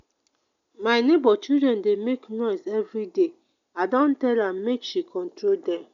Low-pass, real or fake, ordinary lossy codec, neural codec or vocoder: 7.2 kHz; real; none; none